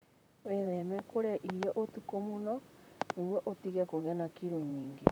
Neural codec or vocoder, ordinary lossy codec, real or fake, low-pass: vocoder, 44.1 kHz, 128 mel bands, Pupu-Vocoder; none; fake; none